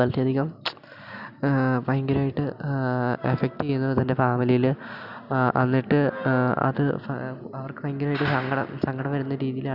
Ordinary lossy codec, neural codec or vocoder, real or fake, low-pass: none; none; real; 5.4 kHz